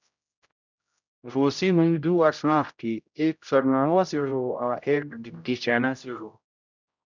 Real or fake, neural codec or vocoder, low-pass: fake; codec, 16 kHz, 0.5 kbps, X-Codec, HuBERT features, trained on general audio; 7.2 kHz